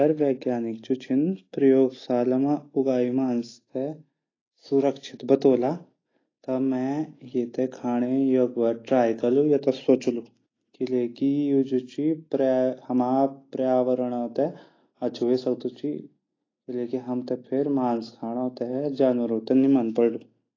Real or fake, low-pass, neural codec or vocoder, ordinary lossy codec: real; 7.2 kHz; none; AAC, 32 kbps